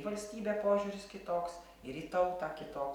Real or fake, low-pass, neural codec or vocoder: real; 19.8 kHz; none